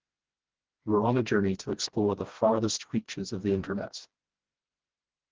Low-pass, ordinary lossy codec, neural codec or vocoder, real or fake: 7.2 kHz; Opus, 16 kbps; codec, 16 kHz, 1 kbps, FreqCodec, smaller model; fake